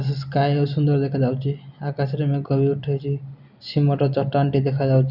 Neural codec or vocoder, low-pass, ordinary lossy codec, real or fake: none; 5.4 kHz; none; real